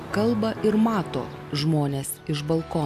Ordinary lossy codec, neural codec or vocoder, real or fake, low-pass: AAC, 96 kbps; none; real; 14.4 kHz